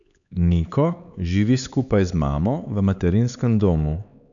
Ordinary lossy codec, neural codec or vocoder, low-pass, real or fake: none; codec, 16 kHz, 4 kbps, X-Codec, HuBERT features, trained on LibriSpeech; 7.2 kHz; fake